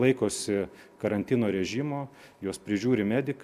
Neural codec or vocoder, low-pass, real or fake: none; 14.4 kHz; real